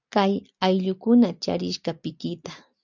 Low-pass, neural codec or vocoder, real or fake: 7.2 kHz; none; real